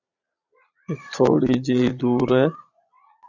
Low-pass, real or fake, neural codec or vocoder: 7.2 kHz; fake; vocoder, 22.05 kHz, 80 mel bands, Vocos